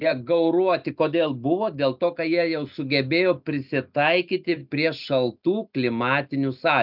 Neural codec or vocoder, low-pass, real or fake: none; 5.4 kHz; real